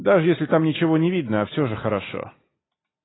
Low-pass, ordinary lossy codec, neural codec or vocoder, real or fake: 7.2 kHz; AAC, 16 kbps; none; real